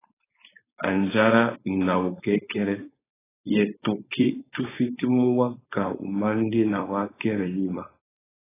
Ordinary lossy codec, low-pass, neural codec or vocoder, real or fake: AAC, 16 kbps; 3.6 kHz; codec, 16 kHz, 4.8 kbps, FACodec; fake